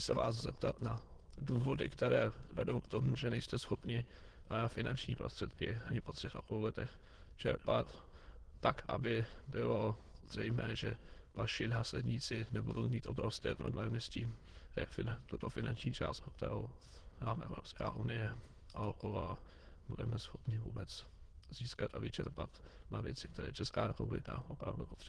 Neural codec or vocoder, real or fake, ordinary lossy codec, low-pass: autoencoder, 22.05 kHz, a latent of 192 numbers a frame, VITS, trained on many speakers; fake; Opus, 16 kbps; 9.9 kHz